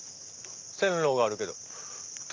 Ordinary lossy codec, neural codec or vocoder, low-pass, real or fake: Opus, 24 kbps; none; 7.2 kHz; real